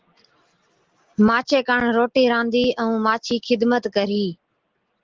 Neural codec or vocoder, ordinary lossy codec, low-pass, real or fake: none; Opus, 16 kbps; 7.2 kHz; real